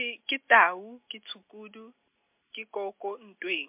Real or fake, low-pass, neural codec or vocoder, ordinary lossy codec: real; 3.6 kHz; none; MP3, 32 kbps